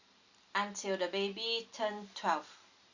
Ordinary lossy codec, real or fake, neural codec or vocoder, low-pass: Opus, 64 kbps; real; none; 7.2 kHz